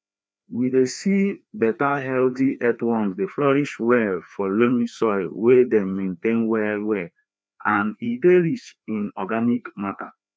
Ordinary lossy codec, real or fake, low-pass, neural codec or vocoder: none; fake; none; codec, 16 kHz, 2 kbps, FreqCodec, larger model